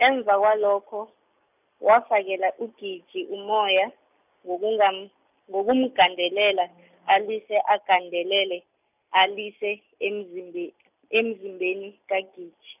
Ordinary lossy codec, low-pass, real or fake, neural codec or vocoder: none; 3.6 kHz; real; none